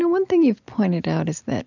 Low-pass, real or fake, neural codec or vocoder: 7.2 kHz; real; none